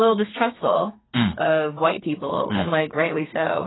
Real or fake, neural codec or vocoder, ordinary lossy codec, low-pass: fake; codec, 32 kHz, 1.9 kbps, SNAC; AAC, 16 kbps; 7.2 kHz